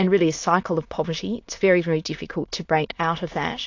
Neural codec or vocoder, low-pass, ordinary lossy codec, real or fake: autoencoder, 22.05 kHz, a latent of 192 numbers a frame, VITS, trained on many speakers; 7.2 kHz; AAC, 48 kbps; fake